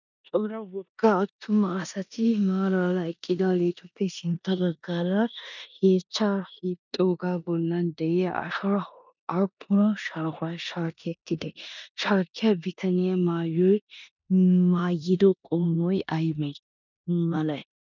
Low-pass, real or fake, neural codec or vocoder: 7.2 kHz; fake; codec, 16 kHz in and 24 kHz out, 0.9 kbps, LongCat-Audio-Codec, four codebook decoder